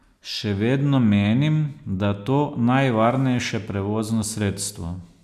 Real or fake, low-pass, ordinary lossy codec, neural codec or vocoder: real; 14.4 kHz; none; none